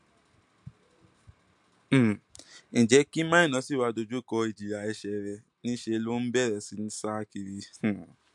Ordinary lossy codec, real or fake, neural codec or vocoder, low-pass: MP3, 64 kbps; real; none; 10.8 kHz